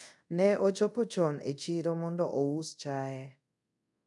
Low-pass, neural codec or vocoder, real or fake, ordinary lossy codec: 10.8 kHz; codec, 24 kHz, 0.5 kbps, DualCodec; fake; none